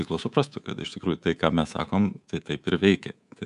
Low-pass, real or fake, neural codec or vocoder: 10.8 kHz; fake; codec, 24 kHz, 3.1 kbps, DualCodec